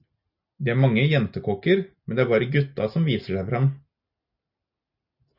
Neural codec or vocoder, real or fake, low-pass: none; real; 5.4 kHz